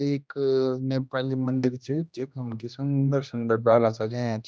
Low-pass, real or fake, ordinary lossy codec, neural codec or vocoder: none; fake; none; codec, 16 kHz, 1 kbps, X-Codec, HuBERT features, trained on general audio